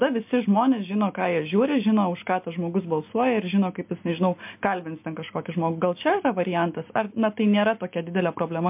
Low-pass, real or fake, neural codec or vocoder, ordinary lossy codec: 3.6 kHz; real; none; MP3, 32 kbps